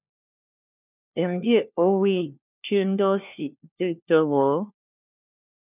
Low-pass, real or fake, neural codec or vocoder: 3.6 kHz; fake; codec, 16 kHz, 1 kbps, FunCodec, trained on LibriTTS, 50 frames a second